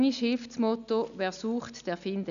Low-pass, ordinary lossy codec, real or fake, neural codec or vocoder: 7.2 kHz; none; real; none